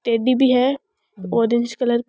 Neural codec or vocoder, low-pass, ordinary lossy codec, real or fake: none; none; none; real